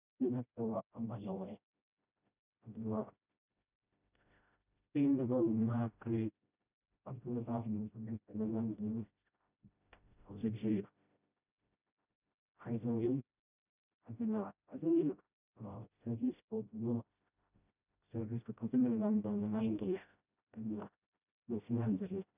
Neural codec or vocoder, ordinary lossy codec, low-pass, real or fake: codec, 16 kHz, 0.5 kbps, FreqCodec, smaller model; none; 3.6 kHz; fake